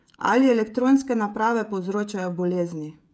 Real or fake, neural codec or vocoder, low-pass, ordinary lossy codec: fake; codec, 16 kHz, 16 kbps, FreqCodec, smaller model; none; none